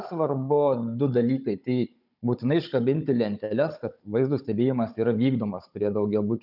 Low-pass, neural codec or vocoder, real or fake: 5.4 kHz; codec, 16 kHz, 16 kbps, FunCodec, trained on Chinese and English, 50 frames a second; fake